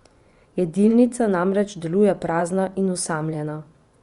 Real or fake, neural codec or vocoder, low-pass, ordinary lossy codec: fake; vocoder, 24 kHz, 100 mel bands, Vocos; 10.8 kHz; Opus, 64 kbps